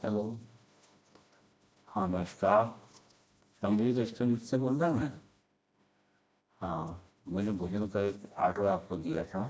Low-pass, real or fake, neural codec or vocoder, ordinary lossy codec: none; fake; codec, 16 kHz, 1 kbps, FreqCodec, smaller model; none